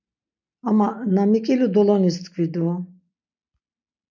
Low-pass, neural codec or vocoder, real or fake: 7.2 kHz; none; real